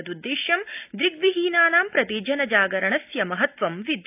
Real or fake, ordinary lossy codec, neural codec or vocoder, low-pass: real; AAC, 32 kbps; none; 3.6 kHz